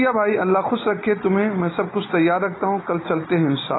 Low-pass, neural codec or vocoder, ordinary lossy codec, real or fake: 7.2 kHz; none; AAC, 16 kbps; real